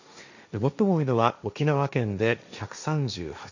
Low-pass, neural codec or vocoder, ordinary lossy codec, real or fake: 7.2 kHz; codec, 16 kHz, 1.1 kbps, Voila-Tokenizer; none; fake